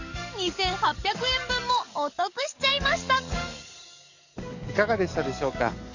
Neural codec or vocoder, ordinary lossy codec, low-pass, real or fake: codec, 44.1 kHz, 7.8 kbps, DAC; none; 7.2 kHz; fake